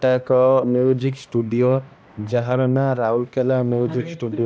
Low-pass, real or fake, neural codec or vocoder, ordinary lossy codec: none; fake; codec, 16 kHz, 1 kbps, X-Codec, HuBERT features, trained on balanced general audio; none